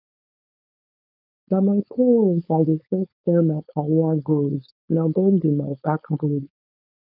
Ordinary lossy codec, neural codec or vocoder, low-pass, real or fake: none; codec, 16 kHz, 4.8 kbps, FACodec; 5.4 kHz; fake